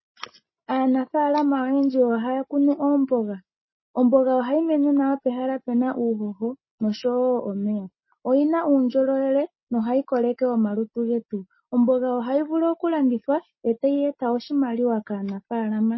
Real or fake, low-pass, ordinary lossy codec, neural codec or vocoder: real; 7.2 kHz; MP3, 24 kbps; none